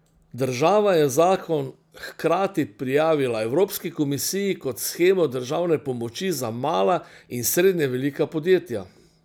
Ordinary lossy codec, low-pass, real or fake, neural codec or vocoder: none; none; real; none